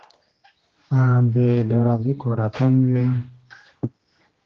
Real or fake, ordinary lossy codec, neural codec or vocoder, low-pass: fake; Opus, 16 kbps; codec, 16 kHz, 1 kbps, X-Codec, HuBERT features, trained on general audio; 7.2 kHz